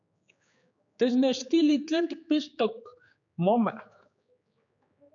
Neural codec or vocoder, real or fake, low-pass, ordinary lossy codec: codec, 16 kHz, 4 kbps, X-Codec, HuBERT features, trained on general audio; fake; 7.2 kHz; AAC, 64 kbps